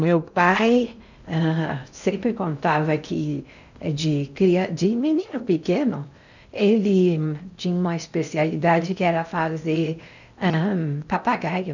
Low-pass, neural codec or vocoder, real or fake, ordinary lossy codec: 7.2 kHz; codec, 16 kHz in and 24 kHz out, 0.6 kbps, FocalCodec, streaming, 2048 codes; fake; none